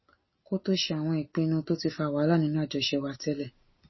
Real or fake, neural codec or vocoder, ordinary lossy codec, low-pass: real; none; MP3, 24 kbps; 7.2 kHz